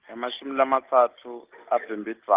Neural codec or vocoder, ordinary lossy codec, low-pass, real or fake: none; Opus, 32 kbps; 3.6 kHz; real